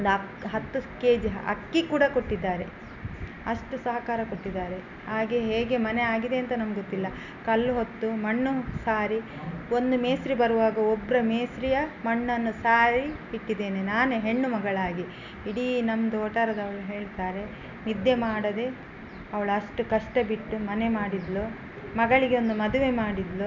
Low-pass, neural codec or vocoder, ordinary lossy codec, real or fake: 7.2 kHz; none; none; real